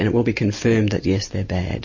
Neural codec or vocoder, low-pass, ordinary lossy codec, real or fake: none; 7.2 kHz; MP3, 32 kbps; real